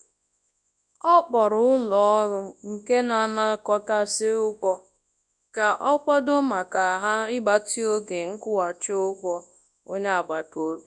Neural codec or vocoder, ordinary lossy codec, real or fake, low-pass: codec, 24 kHz, 0.9 kbps, WavTokenizer, large speech release; none; fake; 10.8 kHz